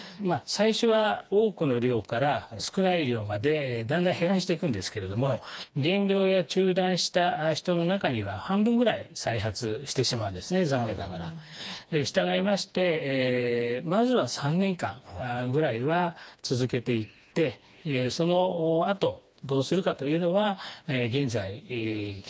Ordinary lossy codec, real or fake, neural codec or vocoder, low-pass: none; fake; codec, 16 kHz, 2 kbps, FreqCodec, smaller model; none